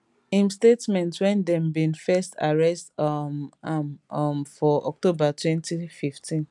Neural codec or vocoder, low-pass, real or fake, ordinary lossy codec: none; 10.8 kHz; real; none